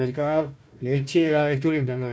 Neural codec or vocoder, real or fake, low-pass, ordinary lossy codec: codec, 16 kHz, 1 kbps, FunCodec, trained on Chinese and English, 50 frames a second; fake; none; none